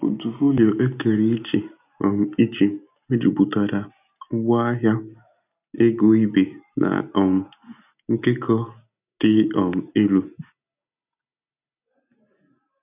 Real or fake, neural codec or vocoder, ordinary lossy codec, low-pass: real; none; none; 3.6 kHz